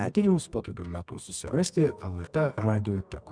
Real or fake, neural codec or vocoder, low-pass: fake; codec, 24 kHz, 0.9 kbps, WavTokenizer, medium music audio release; 9.9 kHz